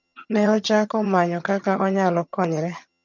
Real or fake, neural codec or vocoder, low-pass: fake; vocoder, 22.05 kHz, 80 mel bands, HiFi-GAN; 7.2 kHz